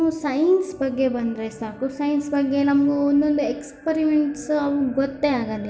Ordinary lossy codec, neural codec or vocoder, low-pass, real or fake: none; none; none; real